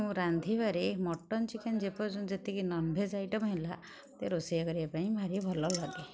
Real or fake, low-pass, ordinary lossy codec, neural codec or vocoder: real; none; none; none